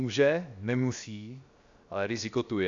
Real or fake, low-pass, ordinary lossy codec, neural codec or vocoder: fake; 7.2 kHz; Opus, 64 kbps; codec, 16 kHz, 0.7 kbps, FocalCodec